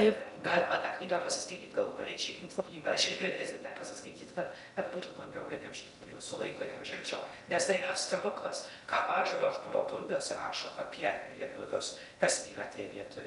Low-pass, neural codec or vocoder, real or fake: 10.8 kHz; codec, 16 kHz in and 24 kHz out, 0.6 kbps, FocalCodec, streaming, 4096 codes; fake